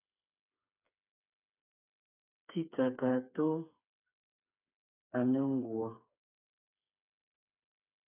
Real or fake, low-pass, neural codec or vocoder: fake; 3.6 kHz; codec, 16 kHz, 4 kbps, FreqCodec, smaller model